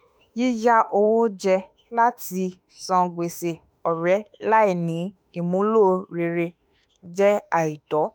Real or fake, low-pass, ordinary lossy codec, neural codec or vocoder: fake; none; none; autoencoder, 48 kHz, 32 numbers a frame, DAC-VAE, trained on Japanese speech